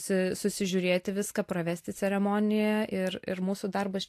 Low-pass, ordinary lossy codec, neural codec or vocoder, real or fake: 14.4 kHz; AAC, 64 kbps; none; real